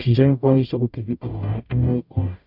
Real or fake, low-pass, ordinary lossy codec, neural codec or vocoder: fake; 5.4 kHz; none; codec, 44.1 kHz, 0.9 kbps, DAC